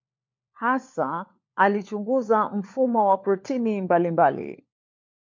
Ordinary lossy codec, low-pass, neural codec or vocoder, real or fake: MP3, 48 kbps; 7.2 kHz; codec, 16 kHz, 4 kbps, FunCodec, trained on LibriTTS, 50 frames a second; fake